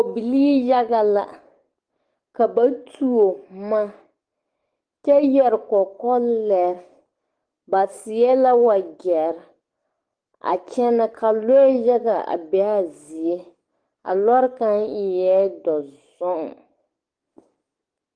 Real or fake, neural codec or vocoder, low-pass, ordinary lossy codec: fake; codec, 44.1 kHz, 7.8 kbps, DAC; 9.9 kHz; Opus, 24 kbps